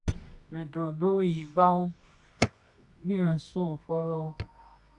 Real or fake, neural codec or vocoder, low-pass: fake; codec, 24 kHz, 0.9 kbps, WavTokenizer, medium music audio release; 10.8 kHz